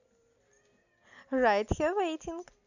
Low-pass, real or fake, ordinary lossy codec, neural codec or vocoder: 7.2 kHz; real; none; none